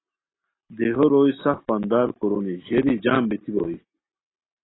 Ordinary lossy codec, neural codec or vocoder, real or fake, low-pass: AAC, 16 kbps; none; real; 7.2 kHz